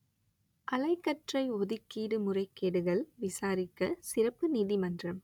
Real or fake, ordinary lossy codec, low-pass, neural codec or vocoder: real; none; 19.8 kHz; none